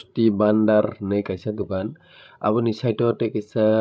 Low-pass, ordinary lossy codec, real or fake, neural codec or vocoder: none; none; fake; codec, 16 kHz, 16 kbps, FreqCodec, larger model